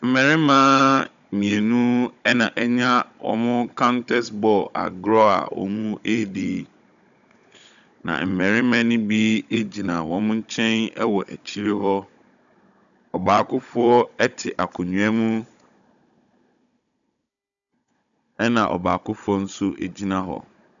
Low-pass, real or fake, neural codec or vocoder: 7.2 kHz; fake; codec, 16 kHz, 16 kbps, FunCodec, trained on Chinese and English, 50 frames a second